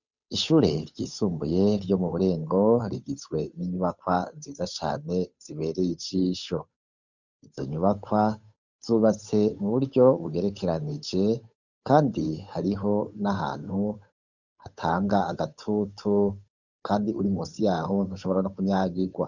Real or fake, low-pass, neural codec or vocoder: fake; 7.2 kHz; codec, 16 kHz, 8 kbps, FunCodec, trained on Chinese and English, 25 frames a second